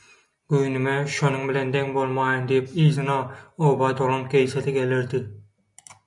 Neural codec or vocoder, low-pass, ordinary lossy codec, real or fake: none; 10.8 kHz; MP3, 96 kbps; real